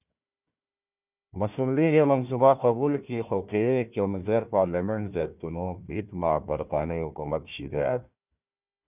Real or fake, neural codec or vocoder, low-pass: fake; codec, 16 kHz, 1 kbps, FunCodec, trained on Chinese and English, 50 frames a second; 3.6 kHz